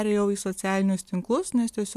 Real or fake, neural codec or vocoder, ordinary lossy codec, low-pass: real; none; AAC, 96 kbps; 14.4 kHz